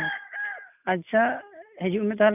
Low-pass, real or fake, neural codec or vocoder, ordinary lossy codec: 3.6 kHz; real; none; none